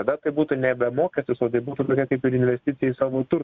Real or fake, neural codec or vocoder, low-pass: real; none; 7.2 kHz